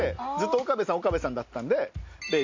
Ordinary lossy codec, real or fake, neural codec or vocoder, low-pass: MP3, 48 kbps; real; none; 7.2 kHz